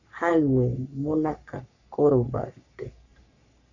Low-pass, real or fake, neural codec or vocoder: 7.2 kHz; fake; codec, 44.1 kHz, 3.4 kbps, Pupu-Codec